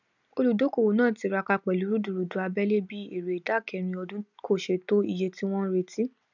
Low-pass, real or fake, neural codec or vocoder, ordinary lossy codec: 7.2 kHz; real; none; none